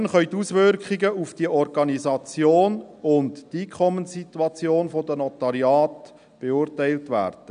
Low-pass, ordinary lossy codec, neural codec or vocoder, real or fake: 9.9 kHz; none; none; real